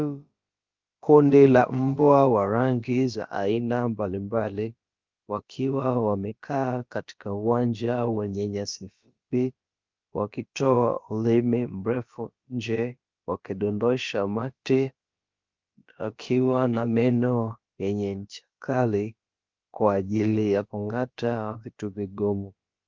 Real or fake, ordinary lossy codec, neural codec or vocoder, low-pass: fake; Opus, 24 kbps; codec, 16 kHz, about 1 kbps, DyCAST, with the encoder's durations; 7.2 kHz